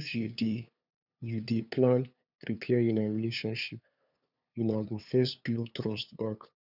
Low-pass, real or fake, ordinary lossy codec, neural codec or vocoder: 5.4 kHz; fake; none; codec, 16 kHz, 2 kbps, FunCodec, trained on LibriTTS, 25 frames a second